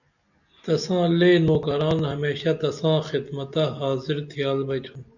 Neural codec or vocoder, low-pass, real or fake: none; 7.2 kHz; real